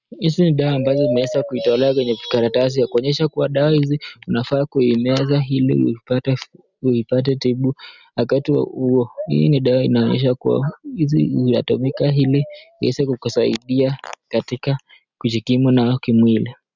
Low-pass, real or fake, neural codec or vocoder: 7.2 kHz; real; none